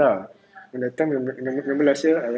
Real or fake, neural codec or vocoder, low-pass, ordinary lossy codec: real; none; none; none